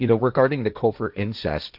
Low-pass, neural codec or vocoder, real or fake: 5.4 kHz; codec, 16 kHz, 1.1 kbps, Voila-Tokenizer; fake